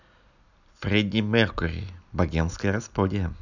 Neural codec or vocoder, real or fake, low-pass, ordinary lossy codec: none; real; 7.2 kHz; none